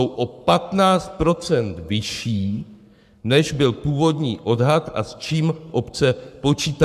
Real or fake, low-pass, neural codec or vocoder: fake; 14.4 kHz; codec, 44.1 kHz, 7.8 kbps, Pupu-Codec